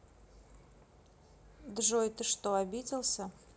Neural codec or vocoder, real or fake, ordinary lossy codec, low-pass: none; real; none; none